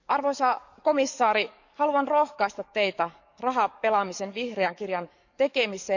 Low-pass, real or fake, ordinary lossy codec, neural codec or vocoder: 7.2 kHz; fake; none; codec, 44.1 kHz, 7.8 kbps, DAC